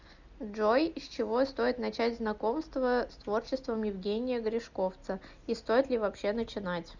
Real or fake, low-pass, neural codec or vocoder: real; 7.2 kHz; none